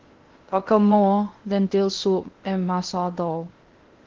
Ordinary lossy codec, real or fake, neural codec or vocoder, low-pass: Opus, 16 kbps; fake; codec, 16 kHz in and 24 kHz out, 0.8 kbps, FocalCodec, streaming, 65536 codes; 7.2 kHz